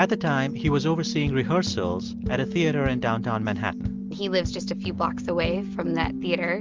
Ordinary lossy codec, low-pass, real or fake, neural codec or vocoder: Opus, 24 kbps; 7.2 kHz; real; none